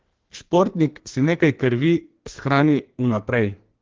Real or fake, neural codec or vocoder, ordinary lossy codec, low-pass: fake; codec, 44.1 kHz, 2.6 kbps, SNAC; Opus, 16 kbps; 7.2 kHz